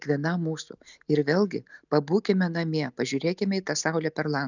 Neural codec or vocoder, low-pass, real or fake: none; 7.2 kHz; real